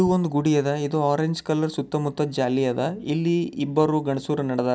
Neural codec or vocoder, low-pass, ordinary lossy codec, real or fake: none; none; none; real